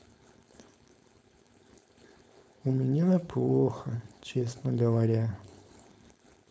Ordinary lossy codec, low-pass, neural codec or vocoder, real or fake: none; none; codec, 16 kHz, 4.8 kbps, FACodec; fake